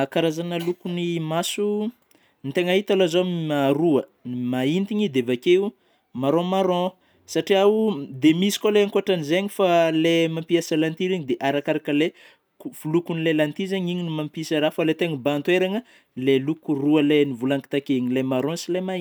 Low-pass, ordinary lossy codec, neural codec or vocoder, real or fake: none; none; none; real